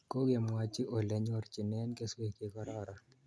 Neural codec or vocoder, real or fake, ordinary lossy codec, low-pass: none; real; none; none